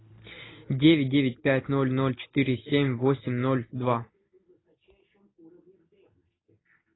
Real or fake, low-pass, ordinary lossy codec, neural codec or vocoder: real; 7.2 kHz; AAC, 16 kbps; none